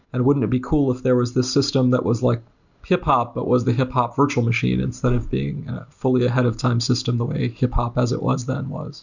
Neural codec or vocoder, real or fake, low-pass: none; real; 7.2 kHz